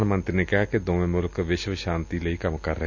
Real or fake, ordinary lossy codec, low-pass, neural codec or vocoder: real; none; 7.2 kHz; none